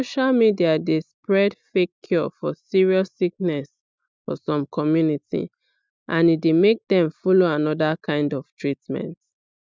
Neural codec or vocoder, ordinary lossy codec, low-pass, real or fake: none; none; none; real